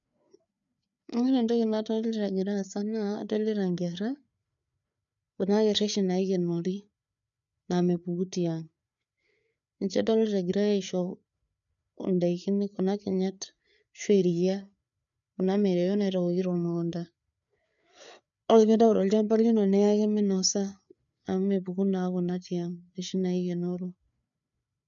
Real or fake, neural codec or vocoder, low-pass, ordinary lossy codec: fake; codec, 16 kHz, 4 kbps, FreqCodec, larger model; 7.2 kHz; none